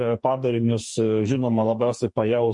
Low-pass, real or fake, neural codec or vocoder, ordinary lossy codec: 10.8 kHz; fake; codec, 44.1 kHz, 2.6 kbps, SNAC; MP3, 48 kbps